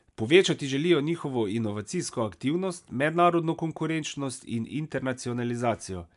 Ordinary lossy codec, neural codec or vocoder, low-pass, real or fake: MP3, 96 kbps; none; 10.8 kHz; real